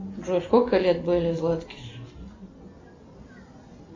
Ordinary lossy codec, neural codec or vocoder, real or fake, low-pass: MP3, 48 kbps; none; real; 7.2 kHz